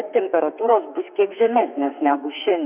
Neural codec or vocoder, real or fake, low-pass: codec, 32 kHz, 1.9 kbps, SNAC; fake; 3.6 kHz